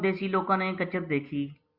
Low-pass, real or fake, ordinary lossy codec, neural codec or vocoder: 5.4 kHz; real; Opus, 64 kbps; none